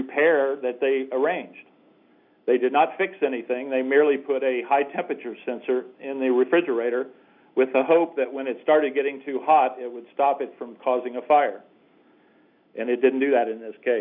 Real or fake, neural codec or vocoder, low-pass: real; none; 5.4 kHz